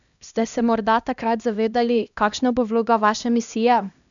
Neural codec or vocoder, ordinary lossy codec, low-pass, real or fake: codec, 16 kHz, 1 kbps, X-Codec, HuBERT features, trained on LibriSpeech; none; 7.2 kHz; fake